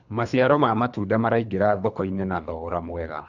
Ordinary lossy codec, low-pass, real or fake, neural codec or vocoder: none; 7.2 kHz; fake; codec, 24 kHz, 3 kbps, HILCodec